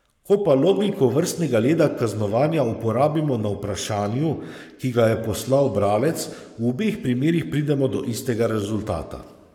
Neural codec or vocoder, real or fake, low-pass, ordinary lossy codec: codec, 44.1 kHz, 7.8 kbps, Pupu-Codec; fake; 19.8 kHz; none